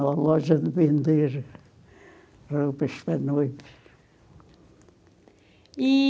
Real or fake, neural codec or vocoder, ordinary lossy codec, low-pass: real; none; none; none